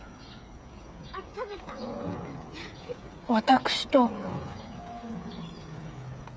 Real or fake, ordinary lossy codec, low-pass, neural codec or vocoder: fake; none; none; codec, 16 kHz, 8 kbps, FreqCodec, smaller model